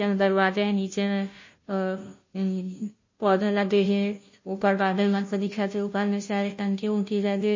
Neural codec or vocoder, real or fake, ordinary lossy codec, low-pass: codec, 16 kHz, 0.5 kbps, FunCodec, trained on Chinese and English, 25 frames a second; fake; MP3, 32 kbps; 7.2 kHz